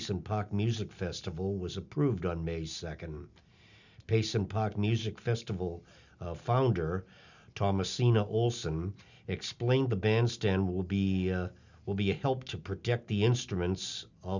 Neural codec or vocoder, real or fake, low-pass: none; real; 7.2 kHz